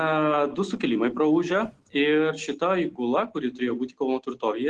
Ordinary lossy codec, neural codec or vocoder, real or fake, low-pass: Opus, 16 kbps; none; real; 10.8 kHz